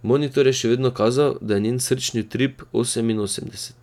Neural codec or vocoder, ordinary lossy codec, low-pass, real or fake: none; none; 19.8 kHz; real